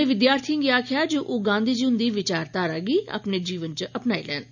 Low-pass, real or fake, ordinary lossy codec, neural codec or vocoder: 7.2 kHz; real; none; none